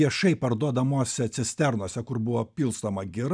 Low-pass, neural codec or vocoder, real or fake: 9.9 kHz; none; real